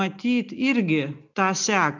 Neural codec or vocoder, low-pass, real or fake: none; 7.2 kHz; real